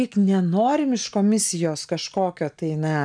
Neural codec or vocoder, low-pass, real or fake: none; 9.9 kHz; real